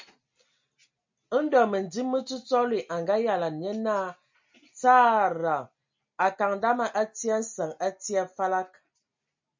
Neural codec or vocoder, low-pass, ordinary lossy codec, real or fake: none; 7.2 kHz; MP3, 64 kbps; real